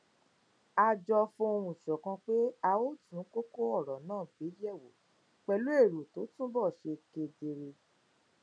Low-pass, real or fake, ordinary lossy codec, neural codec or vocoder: 9.9 kHz; real; none; none